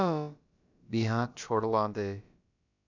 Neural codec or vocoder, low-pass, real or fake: codec, 16 kHz, about 1 kbps, DyCAST, with the encoder's durations; 7.2 kHz; fake